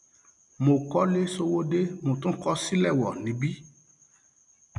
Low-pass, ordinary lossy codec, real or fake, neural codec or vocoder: 10.8 kHz; none; real; none